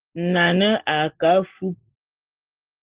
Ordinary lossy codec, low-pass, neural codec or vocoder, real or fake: Opus, 16 kbps; 3.6 kHz; none; real